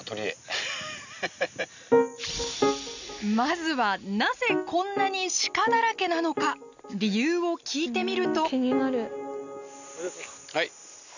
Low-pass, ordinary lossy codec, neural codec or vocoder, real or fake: 7.2 kHz; none; none; real